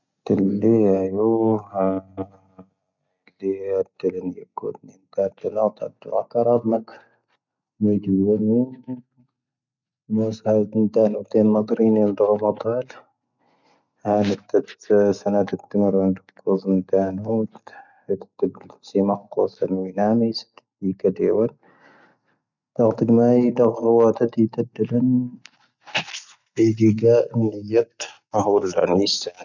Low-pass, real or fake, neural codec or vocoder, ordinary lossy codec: 7.2 kHz; real; none; none